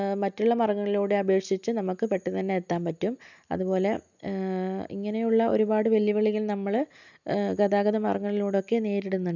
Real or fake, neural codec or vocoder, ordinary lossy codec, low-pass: real; none; none; 7.2 kHz